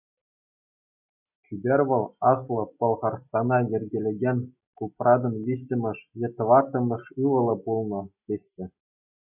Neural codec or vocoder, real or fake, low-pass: none; real; 3.6 kHz